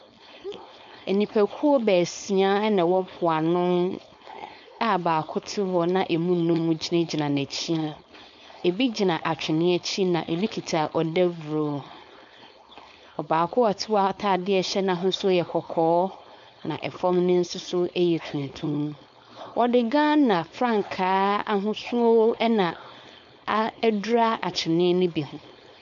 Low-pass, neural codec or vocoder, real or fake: 7.2 kHz; codec, 16 kHz, 4.8 kbps, FACodec; fake